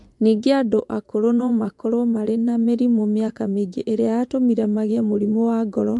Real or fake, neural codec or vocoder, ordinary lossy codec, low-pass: fake; vocoder, 24 kHz, 100 mel bands, Vocos; MP3, 64 kbps; 10.8 kHz